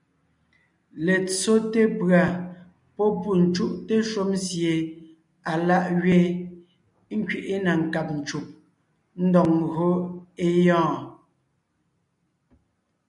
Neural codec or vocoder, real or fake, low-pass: none; real; 10.8 kHz